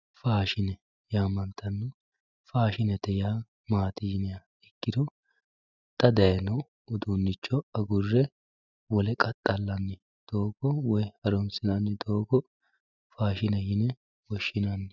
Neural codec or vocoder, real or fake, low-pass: none; real; 7.2 kHz